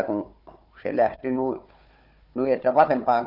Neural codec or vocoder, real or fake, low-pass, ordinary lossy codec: codec, 16 kHz, 4 kbps, FunCodec, trained on Chinese and English, 50 frames a second; fake; 5.4 kHz; none